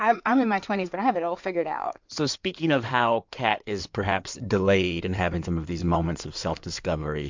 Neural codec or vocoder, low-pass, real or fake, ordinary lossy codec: codec, 16 kHz in and 24 kHz out, 2.2 kbps, FireRedTTS-2 codec; 7.2 kHz; fake; MP3, 64 kbps